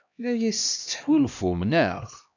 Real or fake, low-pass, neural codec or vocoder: fake; 7.2 kHz; codec, 16 kHz, 1 kbps, X-Codec, HuBERT features, trained on LibriSpeech